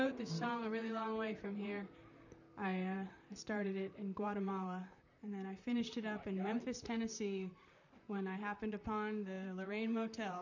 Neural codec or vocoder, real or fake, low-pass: vocoder, 44.1 kHz, 128 mel bands, Pupu-Vocoder; fake; 7.2 kHz